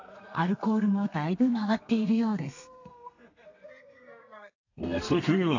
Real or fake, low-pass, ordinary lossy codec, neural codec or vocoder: fake; 7.2 kHz; AAC, 48 kbps; codec, 32 kHz, 1.9 kbps, SNAC